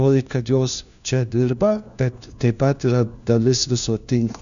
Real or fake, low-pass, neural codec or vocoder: fake; 7.2 kHz; codec, 16 kHz, 1 kbps, FunCodec, trained on LibriTTS, 50 frames a second